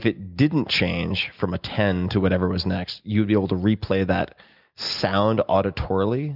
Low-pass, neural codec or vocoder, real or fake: 5.4 kHz; none; real